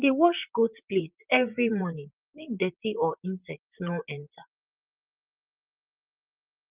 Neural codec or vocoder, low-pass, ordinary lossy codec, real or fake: vocoder, 44.1 kHz, 128 mel bands, Pupu-Vocoder; 3.6 kHz; Opus, 24 kbps; fake